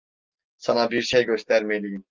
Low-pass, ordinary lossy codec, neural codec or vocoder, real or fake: 7.2 kHz; Opus, 32 kbps; none; real